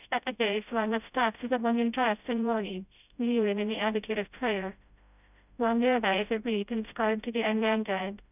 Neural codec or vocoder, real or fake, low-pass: codec, 16 kHz, 0.5 kbps, FreqCodec, smaller model; fake; 3.6 kHz